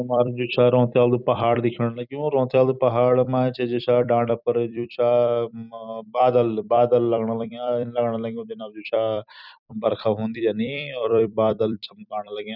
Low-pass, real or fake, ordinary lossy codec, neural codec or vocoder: 5.4 kHz; fake; none; vocoder, 44.1 kHz, 128 mel bands every 256 samples, BigVGAN v2